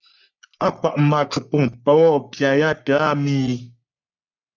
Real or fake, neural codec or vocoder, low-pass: fake; codec, 44.1 kHz, 3.4 kbps, Pupu-Codec; 7.2 kHz